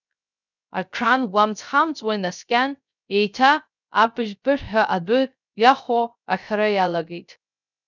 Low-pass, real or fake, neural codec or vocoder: 7.2 kHz; fake; codec, 16 kHz, 0.3 kbps, FocalCodec